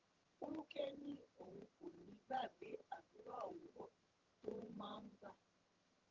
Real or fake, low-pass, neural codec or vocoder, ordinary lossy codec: fake; 7.2 kHz; vocoder, 22.05 kHz, 80 mel bands, HiFi-GAN; Opus, 16 kbps